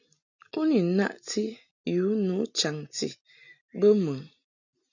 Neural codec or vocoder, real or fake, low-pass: none; real; 7.2 kHz